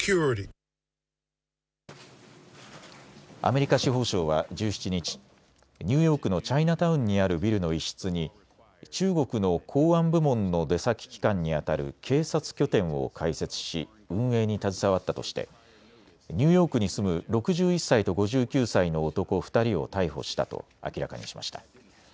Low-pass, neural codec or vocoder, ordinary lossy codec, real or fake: none; none; none; real